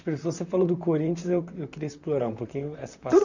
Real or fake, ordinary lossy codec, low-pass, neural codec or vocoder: fake; none; 7.2 kHz; vocoder, 44.1 kHz, 128 mel bands, Pupu-Vocoder